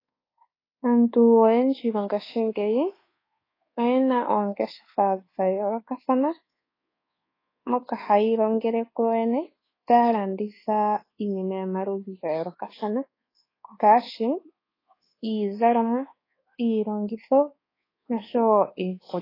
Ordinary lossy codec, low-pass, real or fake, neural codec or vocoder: AAC, 24 kbps; 5.4 kHz; fake; codec, 24 kHz, 1.2 kbps, DualCodec